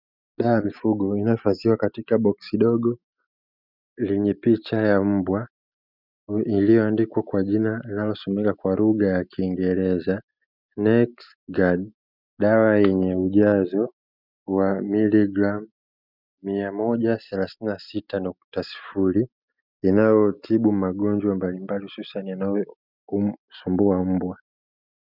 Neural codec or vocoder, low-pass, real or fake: none; 5.4 kHz; real